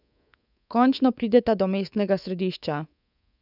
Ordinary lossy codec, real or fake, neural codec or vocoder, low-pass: none; fake; codec, 24 kHz, 3.1 kbps, DualCodec; 5.4 kHz